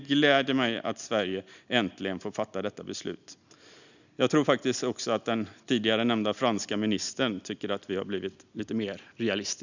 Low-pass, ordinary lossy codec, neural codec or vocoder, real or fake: 7.2 kHz; none; none; real